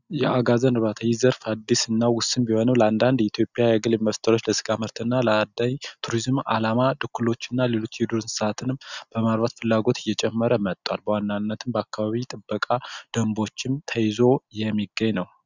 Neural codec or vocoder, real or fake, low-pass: none; real; 7.2 kHz